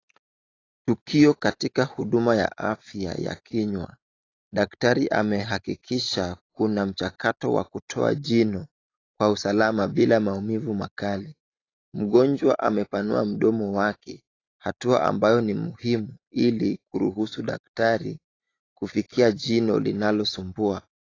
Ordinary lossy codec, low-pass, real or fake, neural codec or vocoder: AAC, 32 kbps; 7.2 kHz; real; none